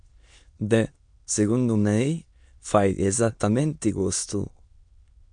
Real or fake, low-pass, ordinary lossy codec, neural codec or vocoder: fake; 9.9 kHz; MP3, 64 kbps; autoencoder, 22.05 kHz, a latent of 192 numbers a frame, VITS, trained on many speakers